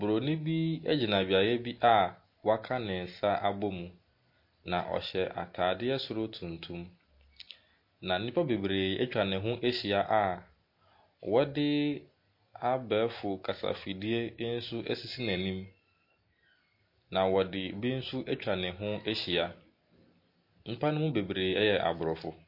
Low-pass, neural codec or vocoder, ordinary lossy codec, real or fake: 5.4 kHz; none; MP3, 32 kbps; real